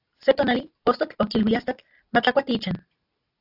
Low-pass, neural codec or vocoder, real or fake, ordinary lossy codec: 5.4 kHz; none; real; AAC, 48 kbps